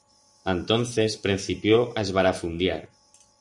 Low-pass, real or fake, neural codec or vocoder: 10.8 kHz; fake; vocoder, 44.1 kHz, 128 mel bands every 512 samples, BigVGAN v2